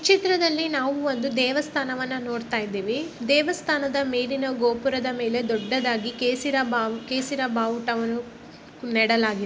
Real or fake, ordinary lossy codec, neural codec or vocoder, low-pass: real; none; none; none